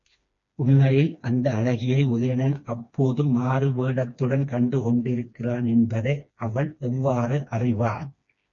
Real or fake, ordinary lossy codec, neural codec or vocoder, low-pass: fake; MP3, 48 kbps; codec, 16 kHz, 2 kbps, FreqCodec, smaller model; 7.2 kHz